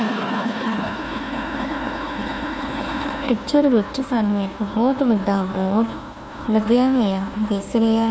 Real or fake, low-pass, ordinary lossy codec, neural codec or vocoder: fake; none; none; codec, 16 kHz, 1 kbps, FunCodec, trained on Chinese and English, 50 frames a second